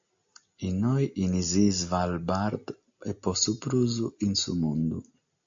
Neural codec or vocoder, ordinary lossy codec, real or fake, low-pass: none; AAC, 64 kbps; real; 7.2 kHz